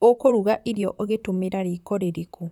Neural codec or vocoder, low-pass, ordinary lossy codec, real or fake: vocoder, 44.1 kHz, 128 mel bands, Pupu-Vocoder; 19.8 kHz; none; fake